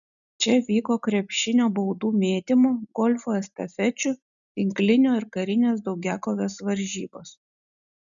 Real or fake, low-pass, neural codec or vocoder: real; 7.2 kHz; none